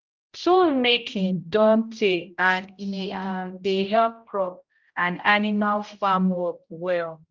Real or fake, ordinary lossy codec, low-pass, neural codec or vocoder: fake; Opus, 24 kbps; 7.2 kHz; codec, 16 kHz, 0.5 kbps, X-Codec, HuBERT features, trained on general audio